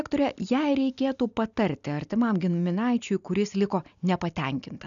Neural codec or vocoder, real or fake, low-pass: none; real; 7.2 kHz